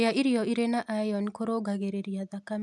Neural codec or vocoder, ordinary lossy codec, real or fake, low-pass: none; none; real; none